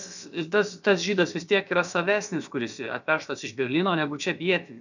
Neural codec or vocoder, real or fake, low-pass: codec, 16 kHz, about 1 kbps, DyCAST, with the encoder's durations; fake; 7.2 kHz